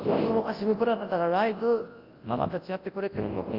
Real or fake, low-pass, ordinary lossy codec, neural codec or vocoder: fake; 5.4 kHz; Opus, 24 kbps; codec, 24 kHz, 0.9 kbps, WavTokenizer, large speech release